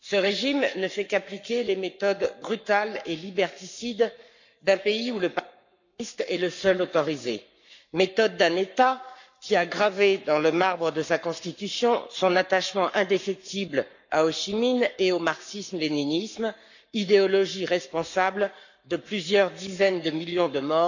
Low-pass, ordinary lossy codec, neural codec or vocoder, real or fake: 7.2 kHz; none; codec, 44.1 kHz, 7.8 kbps, Pupu-Codec; fake